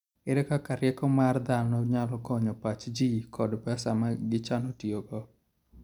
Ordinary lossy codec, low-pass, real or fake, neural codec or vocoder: none; 19.8 kHz; real; none